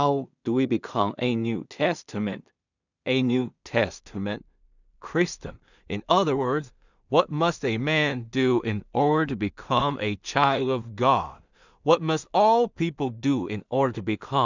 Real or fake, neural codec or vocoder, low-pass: fake; codec, 16 kHz in and 24 kHz out, 0.4 kbps, LongCat-Audio-Codec, two codebook decoder; 7.2 kHz